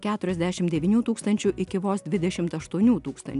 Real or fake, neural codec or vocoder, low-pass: real; none; 10.8 kHz